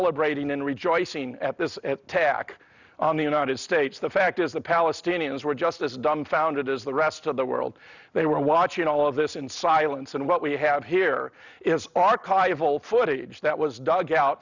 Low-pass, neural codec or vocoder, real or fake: 7.2 kHz; none; real